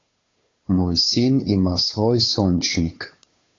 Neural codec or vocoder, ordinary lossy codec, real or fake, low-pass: codec, 16 kHz, 2 kbps, FunCodec, trained on Chinese and English, 25 frames a second; AAC, 32 kbps; fake; 7.2 kHz